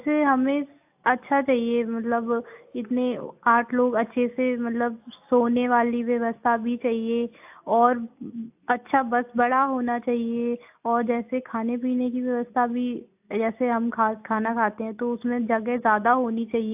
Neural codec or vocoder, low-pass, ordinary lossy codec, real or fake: none; 3.6 kHz; AAC, 32 kbps; real